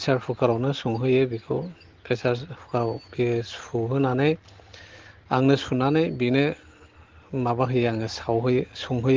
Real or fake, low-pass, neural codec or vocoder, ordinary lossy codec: real; 7.2 kHz; none; Opus, 16 kbps